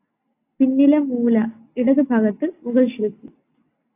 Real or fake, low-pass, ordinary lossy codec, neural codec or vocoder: real; 3.6 kHz; AAC, 24 kbps; none